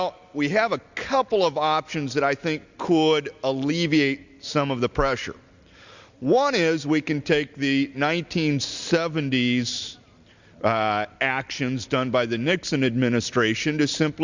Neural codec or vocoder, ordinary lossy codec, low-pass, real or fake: none; Opus, 64 kbps; 7.2 kHz; real